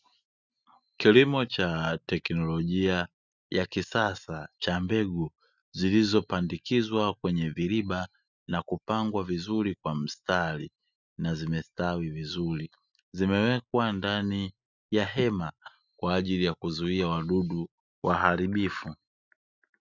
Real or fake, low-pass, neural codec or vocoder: real; 7.2 kHz; none